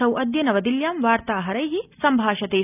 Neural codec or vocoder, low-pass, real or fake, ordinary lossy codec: none; 3.6 kHz; real; none